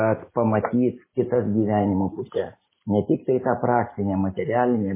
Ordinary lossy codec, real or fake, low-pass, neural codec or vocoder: MP3, 16 kbps; real; 3.6 kHz; none